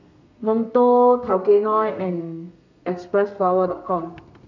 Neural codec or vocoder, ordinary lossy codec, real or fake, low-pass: codec, 32 kHz, 1.9 kbps, SNAC; none; fake; 7.2 kHz